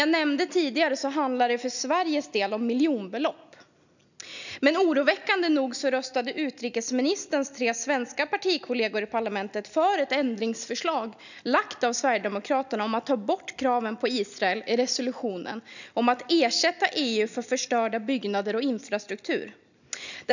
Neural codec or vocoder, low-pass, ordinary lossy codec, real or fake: none; 7.2 kHz; none; real